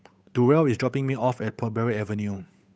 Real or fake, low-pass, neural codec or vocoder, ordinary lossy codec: fake; none; codec, 16 kHz, 8 kbps, FunCodec, trained on Chinese and English, 25 frames a second; none